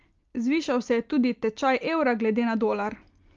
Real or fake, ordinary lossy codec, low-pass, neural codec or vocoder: real; Opus, 32 kbps; 7.2 kHz; none